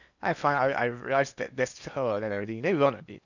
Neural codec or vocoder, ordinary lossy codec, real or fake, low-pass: codec, 16 kHz in and 24 kHz out, 0.8 kbps, FocalCodec, streaming, 65536 codes; none; fake; 7.2 kHz